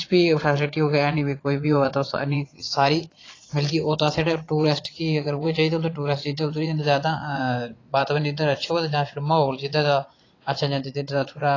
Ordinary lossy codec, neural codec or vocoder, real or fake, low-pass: AAC, 32 kbps; vocoder, 22.05 kHz, 80 mel bands, Vocos; fake; 7.2 kHz